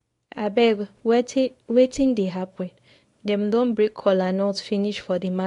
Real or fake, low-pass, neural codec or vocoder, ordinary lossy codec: fake; 10.8 kHz; codec, 24 kHz, 0.9 kbps, WavTokenizer, small release; AAC, 48 kbps